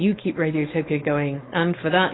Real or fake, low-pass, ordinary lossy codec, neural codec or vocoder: fake; 7.2 kHz; AAC, 16 kbps; codec, 16 kHz, 4.8 kbps, FACodec